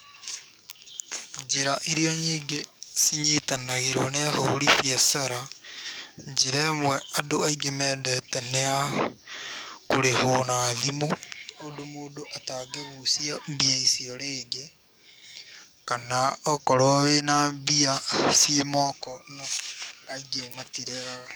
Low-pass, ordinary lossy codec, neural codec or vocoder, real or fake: none; none; codec, 44.1 kHz, 7.8 kbps, DAC; fake